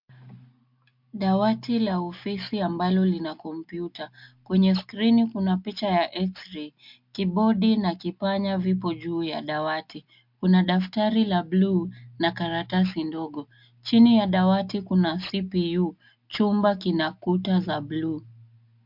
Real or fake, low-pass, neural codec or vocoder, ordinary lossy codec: real; 5.4 kHz; none; MP3, 48 kbps